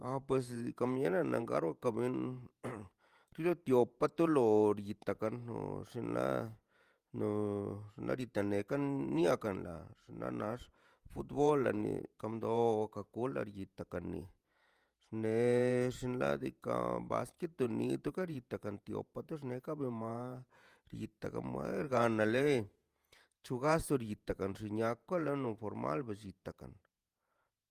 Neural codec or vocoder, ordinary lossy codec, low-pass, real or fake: vocoder, 48 kHz, 128 mel bands, Vocos; Opus, 32 kbps; 14.4 kHz; fake